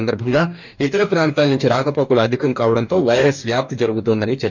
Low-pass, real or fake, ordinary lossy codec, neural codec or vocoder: 7.2 kHz; fake; none; codec, 44.1 kHz, 2.6 kbps, DAC